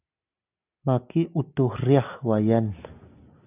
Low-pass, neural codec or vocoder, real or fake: 3.6 kHz; none; real